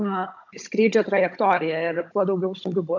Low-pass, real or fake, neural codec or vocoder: 7.2 kHz; fake; codec, 16 kHz, 16 kbps, FunCodec, trained on Chinese and English, 50 frames a second